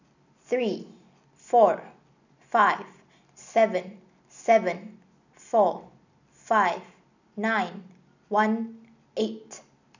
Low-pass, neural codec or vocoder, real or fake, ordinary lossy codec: 7.2 kHz; none; real; none